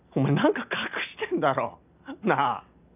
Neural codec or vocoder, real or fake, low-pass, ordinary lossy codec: none; real; 3.6 kHz; none